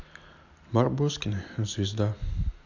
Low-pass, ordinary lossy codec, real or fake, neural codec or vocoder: 7.2 kHz; AAC, 48 kbps; real; none